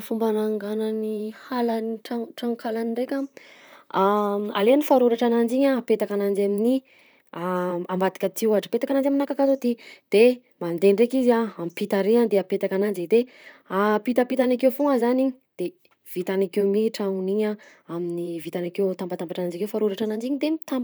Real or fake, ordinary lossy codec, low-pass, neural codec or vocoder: fake; none; none; vocoder, 44.1 kHz, 128 mel bands, Pupu-Vocoder